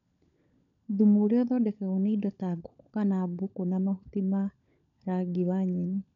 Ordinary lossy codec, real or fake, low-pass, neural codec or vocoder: none; fake; 7.2 kHz; codec, 16 kHz, 16 kbps, FunCodec, trained on LibriTTS, 50 frames a second